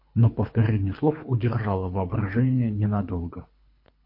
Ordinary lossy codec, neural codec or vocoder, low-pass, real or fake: MP3, 32 kbps; codec, 24 kHz, 3 kbps, HILCodec; 5.4 kHz; fake